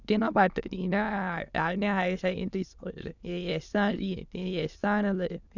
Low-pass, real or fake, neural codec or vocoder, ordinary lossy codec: 7.2 kHz; fake; autoencoder, 22.05 kHz, a latent of 192 numbers a frame, VITS, trained on many speakers; none